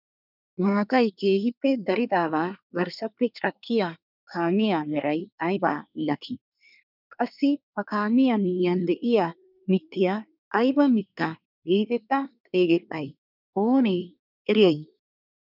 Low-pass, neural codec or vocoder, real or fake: 5.4 kHz; codec, 24 kHz, 1 kbps, SNAC; fake